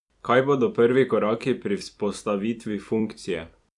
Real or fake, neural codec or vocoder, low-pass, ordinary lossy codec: fake; vocoder, 44.1 kHz, 128 mel bands every 256 samples, BigVGAN v2; 10.8 kHz; none